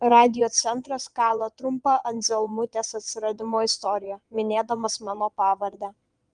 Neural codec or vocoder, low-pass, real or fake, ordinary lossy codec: vocoder, 22.05 kHz, 80 mel bands, Vocos; 9.9 kHz; fake; Opus, 32 kbps